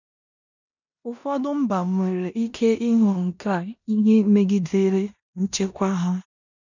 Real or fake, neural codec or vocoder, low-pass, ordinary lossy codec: fake; codec, 16 kHz in and 24 kHz out, 0.9 kbps, LongCat-Audio-Codec, four codebook decoder; 7.2 kHz; none